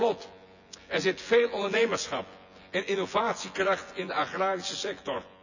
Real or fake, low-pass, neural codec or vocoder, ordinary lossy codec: fake; 7.2 kHz; vocoder, 24 kHz, 100 mel bands, Vocos; none